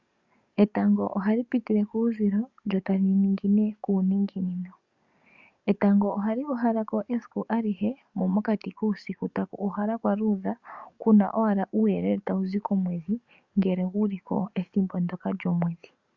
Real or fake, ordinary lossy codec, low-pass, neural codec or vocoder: fake; Opus, 64 kbps; 7.2 kHz; codec, 44.1 kHz, 7.8 kbps, DAC